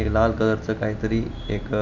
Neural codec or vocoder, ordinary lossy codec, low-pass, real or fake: none; none; 7.2 kHz; real